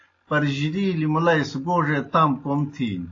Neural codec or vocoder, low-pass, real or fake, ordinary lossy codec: none; 7.2 kHz; real; AAC, 32 kbps